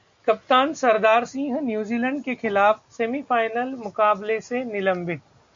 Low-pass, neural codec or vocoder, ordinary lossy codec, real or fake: 7.2 kHz; none; MP3, 48 kbps; real